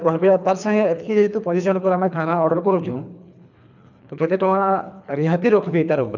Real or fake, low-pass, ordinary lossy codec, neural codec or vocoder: fake; 7.2 kHz; none; codec, 24 kHz, 3 kbps, HILCodec